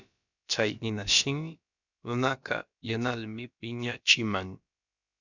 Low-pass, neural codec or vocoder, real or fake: 7.2 kHz; codec, 16 kHz, about 1 kbps, DyCAST, with the encoder's durations; fake